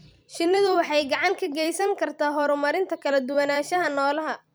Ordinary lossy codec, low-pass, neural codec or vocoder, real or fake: none; none; vocoder, 44.1 kHz, 128 mel bands every 256 samples, BigVGAN v2; fake